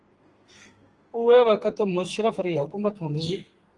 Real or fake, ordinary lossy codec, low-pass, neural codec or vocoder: fake; Opus, 24 kbps; 10.8 kHz; codec, 32 kHz, 1.9 kbps, SNAC